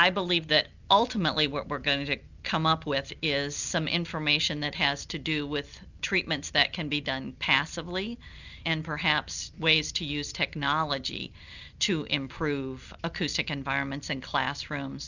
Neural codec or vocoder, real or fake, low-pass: none; real; 7.2 kHz